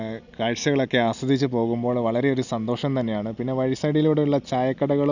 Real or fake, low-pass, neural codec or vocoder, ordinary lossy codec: real; 7.2 kHz; none; none